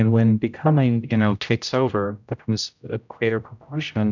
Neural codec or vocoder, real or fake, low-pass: codec, 16 kHz, 0.5 kbps, X-Codec, HuBERT features, trained on general audio; fake; 7.2 kHz